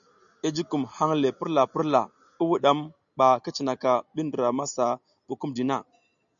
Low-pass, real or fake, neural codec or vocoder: 7.2 kHz; real; none